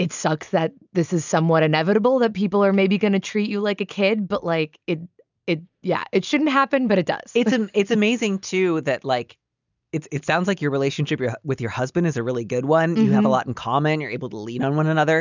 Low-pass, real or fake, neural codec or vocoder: 7.2 kHz; real; none